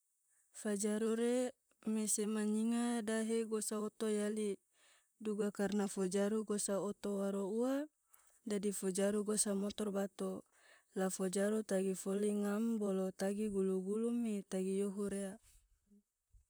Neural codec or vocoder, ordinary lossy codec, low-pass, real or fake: vocoder, 44.1 kHz, 128 mel bands, Pupu-Vocoder; none; none; fake